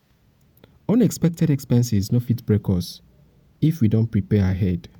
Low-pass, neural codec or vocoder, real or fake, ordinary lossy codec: 19.8 kHz; vocoder, 48 kHz, 128 mel bands, Vocos; fake; none